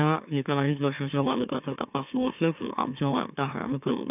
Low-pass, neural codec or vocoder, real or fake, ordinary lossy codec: 3.6 kHz; autoencoder, 44.1 kHz, a latent of 192 numbers a frame, MeloTTS; fake; none